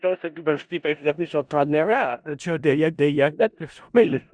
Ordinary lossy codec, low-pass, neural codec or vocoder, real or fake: Opus, 64 kbps; 9.9 kHz; codec, 16 kHz in and 24 kHz out, 0.4 kbps, LongCat-Audio-Codec, four codebook decoder; fake